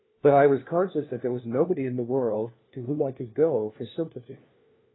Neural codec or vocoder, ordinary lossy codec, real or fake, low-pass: codec, 16 kHz, 1 kbps, FunCodec, trained on LibriTTS, 50 frames a second; AAC, 16 kbps; fake; 7.2 kHz